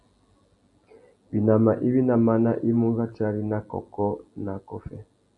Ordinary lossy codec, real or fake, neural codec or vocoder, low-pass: MP3, 96 kbps; real; none; 10.8 kHz